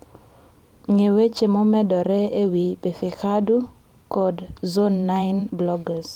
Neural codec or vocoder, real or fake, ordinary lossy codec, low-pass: vocoder, 44.1 kHz, 128 mel bands every 512 samples, BigVGAN v2; fake; Opus, 24 kbps; 19.8 kHz